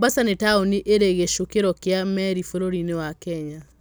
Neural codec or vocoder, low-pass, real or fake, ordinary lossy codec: none; none; real; none